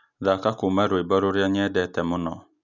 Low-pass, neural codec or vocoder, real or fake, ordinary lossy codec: 7.2 kHz; none; real; none